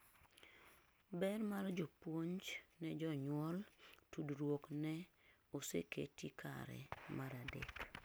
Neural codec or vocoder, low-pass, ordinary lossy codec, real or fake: none; none; none; real